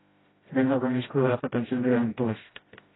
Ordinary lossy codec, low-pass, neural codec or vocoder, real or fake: AAC, 16 kbps; 7.2 kHz; codec, 16 kHz, 0.5 kbps, FreqCodec, smaller model; fake